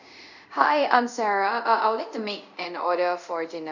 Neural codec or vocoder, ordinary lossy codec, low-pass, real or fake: codec, 24 kHz, 0.5 kbps, DualCodec; none; 7.2 kHz; fake